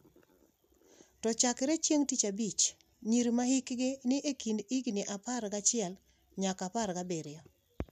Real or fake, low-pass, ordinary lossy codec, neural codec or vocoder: real; 14.4 kHz; none; none